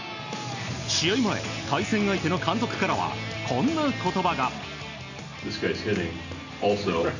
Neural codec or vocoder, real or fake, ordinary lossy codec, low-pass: none; real; none; 7.2 kHz